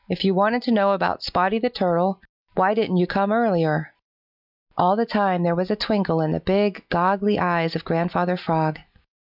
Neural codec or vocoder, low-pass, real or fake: none; 5.4 kHz; real